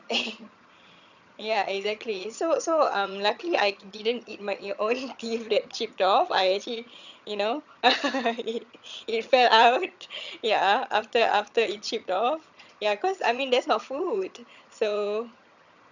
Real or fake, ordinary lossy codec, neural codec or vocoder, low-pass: fake; none; vocoder, 22.05 kHz, 80 mel bands, HiFi-GAN; 7.2 kHz